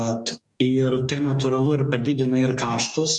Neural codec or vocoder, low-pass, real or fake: codec, 44.1 kHz, 2.6 kbps, DAC; 10.8 kHz; fake